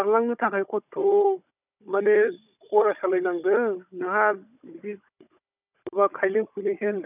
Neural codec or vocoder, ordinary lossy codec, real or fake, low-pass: codec, 16 kHz, 16 kbps, FunCodec, trained on Chinese and English, 50 frames a second; none; fake; 3.6 kHz